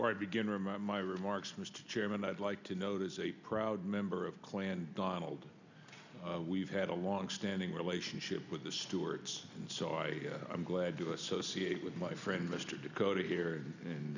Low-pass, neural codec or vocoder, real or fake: 7.2 kHz; none; real